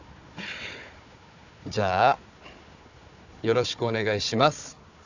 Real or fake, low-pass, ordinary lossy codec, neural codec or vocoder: fake; 7.2 kHz; none; codec, 16 kHz, 4 kbps, FunCodec, trained on Chinese and English, 50 frames a second